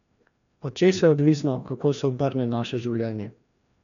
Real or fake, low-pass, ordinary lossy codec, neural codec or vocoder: fake; 7.2 kHz; none; codec, 16 kHz, 1 kbps, FreqCodec, larger model